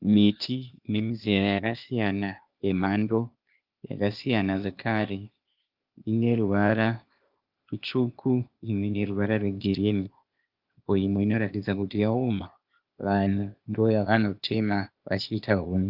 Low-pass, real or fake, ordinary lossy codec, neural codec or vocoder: 5.4 kHz; fake; Opus, 24 kbps; codec, 16 kHz, 0.8 kbps, ZipCodec